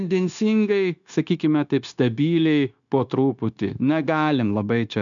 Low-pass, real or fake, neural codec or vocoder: 7.2 kHz; fake; codec, 16 kHz, 0.9 kbps, LongCat-Audio-Codec